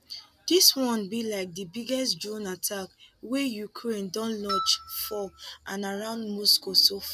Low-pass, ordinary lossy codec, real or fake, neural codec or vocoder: 14.4 kHz; none; real; none